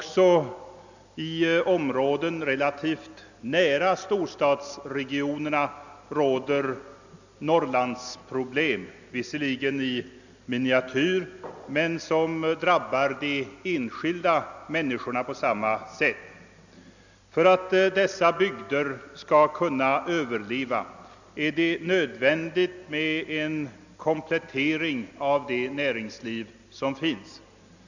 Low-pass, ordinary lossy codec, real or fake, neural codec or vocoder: 7.2 kHz; none; real; none